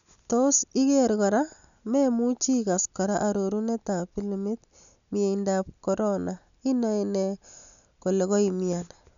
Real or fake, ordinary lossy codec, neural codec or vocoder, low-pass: real; none; none; 7.2 kHz